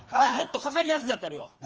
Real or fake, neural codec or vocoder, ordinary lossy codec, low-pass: fake; codec, 16 kHz, 2 kbps, FreqCodec, larger model; Opus, 24 kbps; 7.2 kHz